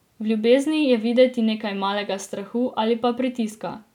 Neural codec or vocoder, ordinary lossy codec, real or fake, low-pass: none; none; real; 19.8 kHz